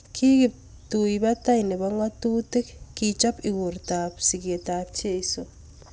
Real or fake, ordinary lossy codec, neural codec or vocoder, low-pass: real; none; none; none